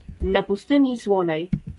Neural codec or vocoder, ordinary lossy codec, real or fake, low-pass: codec, 44.1 kHz, 2.6 kbps, SNAC; MP3, 48 kbps; fake; 14.4 kHz